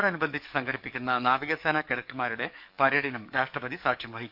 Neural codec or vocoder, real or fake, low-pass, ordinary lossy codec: codec, 44.1 kHz, 7.8 kbps, DAC; fake; 5.4 kHz; none